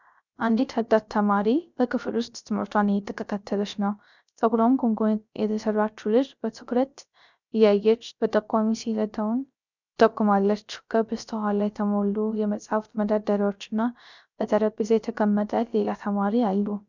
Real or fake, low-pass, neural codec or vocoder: fake; 7.2 kHz; codec, 16 kHz, 0.3 kbps, FocalCodec